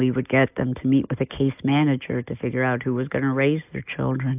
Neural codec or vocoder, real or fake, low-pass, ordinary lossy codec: none; real; 3.6 kHz; AAC, 32 kbps